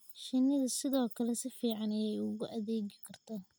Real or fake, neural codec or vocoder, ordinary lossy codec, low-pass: real; none; none; none